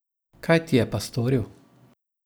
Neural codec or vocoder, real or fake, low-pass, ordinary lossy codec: none; real; none; none